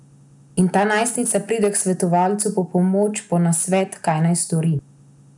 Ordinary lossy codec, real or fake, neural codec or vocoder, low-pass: none; real; none; 10.8 kHz